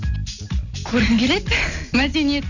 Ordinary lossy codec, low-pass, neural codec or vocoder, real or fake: none; 7.2 kHz; none; real